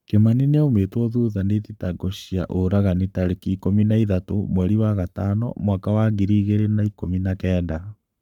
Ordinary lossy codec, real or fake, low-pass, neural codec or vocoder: none; fake; 19.8 kHz; codec, 44.1 kHz, 7.8 kbps, Pupu-Codec